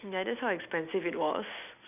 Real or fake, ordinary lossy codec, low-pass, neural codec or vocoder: real; none; 3.6 kHz; none